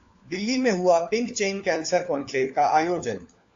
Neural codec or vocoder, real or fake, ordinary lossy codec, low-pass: codec, 16 kHz, 4 kbps, FunCodec, trained on LibriTTS, 50 frames a second; fake; AAC, 48 kbps; 7.2 kHz